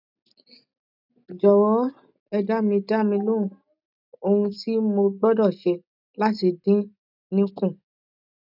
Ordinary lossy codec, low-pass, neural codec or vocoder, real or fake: none; 5.4 kHz; none; real